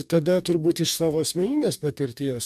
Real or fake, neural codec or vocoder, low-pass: fake; codec, 44.1 kHz, 2.6 kbps, DAC; 14.4 kHz